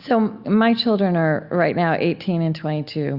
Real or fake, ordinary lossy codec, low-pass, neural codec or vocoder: real; Opus, 64 kbps; 5.4 kHz; none